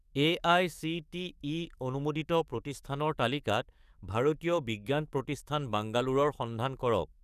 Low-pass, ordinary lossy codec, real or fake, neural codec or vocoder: 14.4 kHz; none; fake; codec, 44.1 kHz, 7.8 kbps, DAC